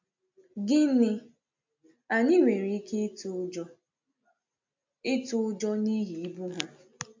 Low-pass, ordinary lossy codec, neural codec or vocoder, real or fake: 7.2 kHz; MP3, 64 kbps; none; real